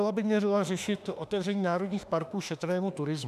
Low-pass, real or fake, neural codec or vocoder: 14.4 kHz; fake; autoencoder, 48 kHz, 32 numbers a frame, DAC-VAE, trained on Japanese speech